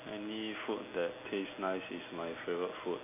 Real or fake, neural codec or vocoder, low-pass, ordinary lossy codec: real; none; 3.6 kHz; none